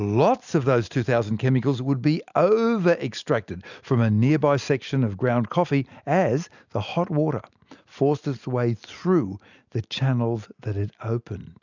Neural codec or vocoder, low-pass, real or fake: none; 7.2 kHz; real